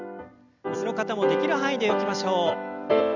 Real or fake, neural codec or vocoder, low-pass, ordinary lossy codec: real; none; 7.2 kHz; none